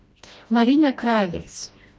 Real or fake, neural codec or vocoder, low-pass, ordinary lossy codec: fake; codec, 16 kHz, 1 kbps, FreqCodec, smaller model; none; none